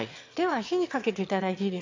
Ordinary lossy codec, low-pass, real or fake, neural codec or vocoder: MP3, 48 kbps; 7.2 kHz; fake; autoencoder, 22.05 kHz, a latent of 192 numbers a frame, VITS, trained on one speaker